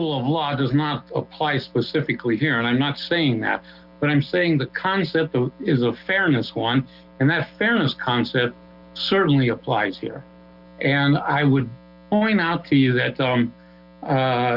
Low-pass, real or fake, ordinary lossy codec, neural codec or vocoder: 5.4 kHz; real; Opus, 32 kbps; none